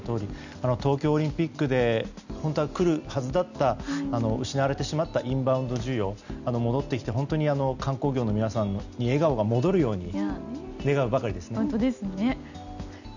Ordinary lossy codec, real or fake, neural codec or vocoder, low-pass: none; real; none; 7.2 kHz